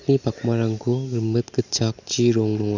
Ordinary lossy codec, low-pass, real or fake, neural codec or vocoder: none; 7.2 kHz; real; none